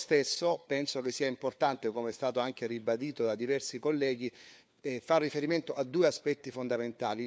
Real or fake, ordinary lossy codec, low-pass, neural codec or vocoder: fake; none; none; codec, 16 kHz, 4 kbps, FunCodec, trained on LibriTTS, 50 frames a second